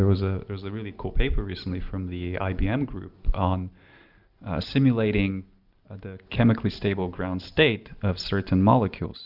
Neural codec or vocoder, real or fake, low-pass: none; real; 5.4 kHz